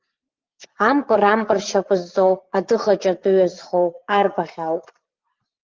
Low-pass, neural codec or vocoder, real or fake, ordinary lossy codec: 7.2 kHz; vocoder, 44.1 kHz, 80 mel bands, Vocos; fake; Opus, 32 kbps